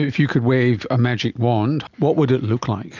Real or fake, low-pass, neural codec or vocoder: real; 7.2 kHz; none